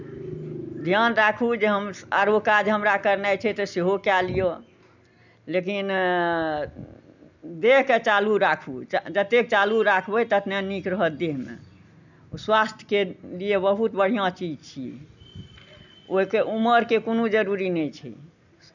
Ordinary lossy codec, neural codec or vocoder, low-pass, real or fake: none; none; 7.2 kHz; real